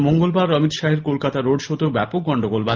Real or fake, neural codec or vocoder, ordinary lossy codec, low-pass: real; none; Opus, 32 kbps; 7.2 kHz